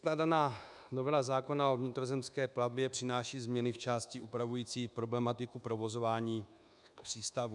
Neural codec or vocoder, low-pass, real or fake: codec, 24 kHz, 1.2 kbps, DualCodec; 10.8 kHz; fake